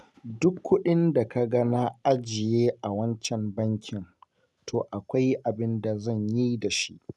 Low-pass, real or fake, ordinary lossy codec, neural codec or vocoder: none; real; none; none